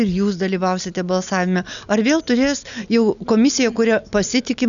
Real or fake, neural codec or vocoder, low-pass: real; none; 7.2 kHz